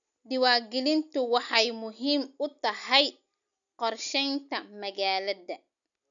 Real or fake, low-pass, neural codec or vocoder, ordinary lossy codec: real; 7.2 kHz; none; none